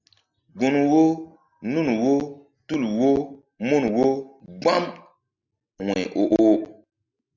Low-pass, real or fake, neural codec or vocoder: 7.2 kHz; real; none